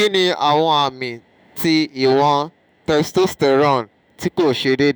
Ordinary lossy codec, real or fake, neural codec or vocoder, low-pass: none; fake; autoencoder, 48 kHz, 128 numbers a frame, DAC-VAE, trained on Japanese speech; none